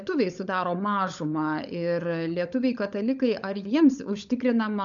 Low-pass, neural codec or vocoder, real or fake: 7.2 kHz; codec, 16 kHz, 16 kbps, FunCodec, trained on LibriTTS, 50 frames a second; fake